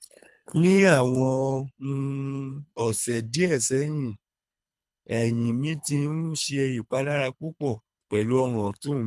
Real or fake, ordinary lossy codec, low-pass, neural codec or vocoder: fake; none; none; codec, 24 kHz, 3 kbps, HILCodec